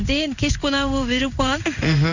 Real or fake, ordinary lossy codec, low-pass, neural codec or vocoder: fake; none; 7.2 kHz; codec, 16 kHz in and 24 kHz out, 1 kbps, XY-Tokenizer